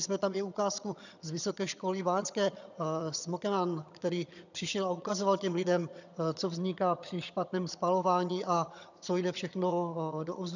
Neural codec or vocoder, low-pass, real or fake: vocoder, 22.05 kHz, 80 mel bands, HiFi-GAN; 7.2 kHz; fake